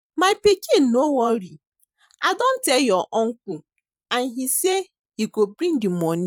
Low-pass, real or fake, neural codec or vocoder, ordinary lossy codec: 19.8 kHz; fake; vocoder, 44.1 kHz, 128 mel bands every 512 samples, BigVGAN v2; none